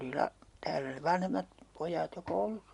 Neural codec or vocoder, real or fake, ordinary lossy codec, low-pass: none; real; MP3, 64 kbps; 10.8 kHz